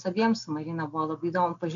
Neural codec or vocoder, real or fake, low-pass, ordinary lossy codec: none; real; 7.2 kHz; AAC, 64 kbps